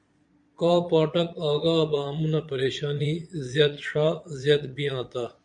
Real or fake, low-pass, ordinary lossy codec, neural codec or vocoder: fake; 9.9 kHz; MP3, 64 kbps; vocoder, 22.05 kHz, 80 mel bands, Vocos